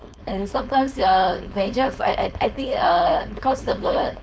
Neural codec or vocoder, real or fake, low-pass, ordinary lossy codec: codec, 16 kHz, 4.8 kbps, FACodec; fake; none; none